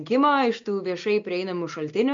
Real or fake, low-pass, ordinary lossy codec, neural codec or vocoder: real; 7.2 kHz; MP3, 48 kbps; none